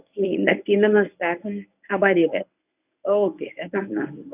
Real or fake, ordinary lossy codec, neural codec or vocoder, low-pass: fake; none; codec, 24 kHz, 0.9 kbps, WavTokenizer, medium speech release version 1; 3.6 kHz